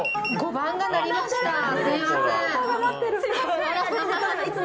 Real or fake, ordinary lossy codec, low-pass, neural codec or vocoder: real; none; none; none